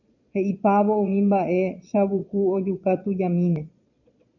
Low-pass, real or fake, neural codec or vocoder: 7.2 kHz; fake; vocoder, 22.05 kHz, 80 mel bands, Vocos